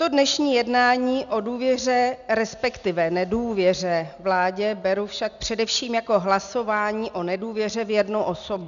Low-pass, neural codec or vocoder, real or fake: 7.2 kHz; none; real